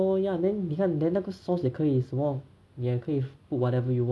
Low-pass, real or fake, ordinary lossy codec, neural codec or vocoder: none; real; none; none